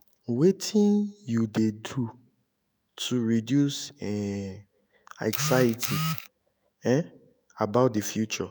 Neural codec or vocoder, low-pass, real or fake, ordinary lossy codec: autoencoder, 48 kHz, 128 numbers a frame, DAC-VAE, trained on Japanese speech; none; fake; none